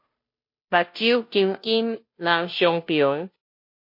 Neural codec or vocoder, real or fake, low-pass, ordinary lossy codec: codec, 16 kHz, 0.5 kbps, FunCodec, trained on Chinese and English, 25 frames a second; fake; 5.4 kHz; MP3, 32 kbps